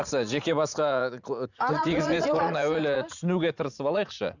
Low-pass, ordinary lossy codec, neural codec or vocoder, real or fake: 7.2 kHz; none; vocoder, 44.1 kHz, 80 mel bands, Vocos; fake